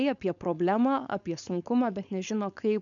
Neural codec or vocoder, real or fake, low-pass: codec, 16 kHz, 4.8 kbps, FACodec; fake; 7.2 kHz